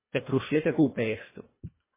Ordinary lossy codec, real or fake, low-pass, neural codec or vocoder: MP3, 16 kbps; fake; 3.6 kHz; codec, 24 kHz, 1.5 kbps, HILCodec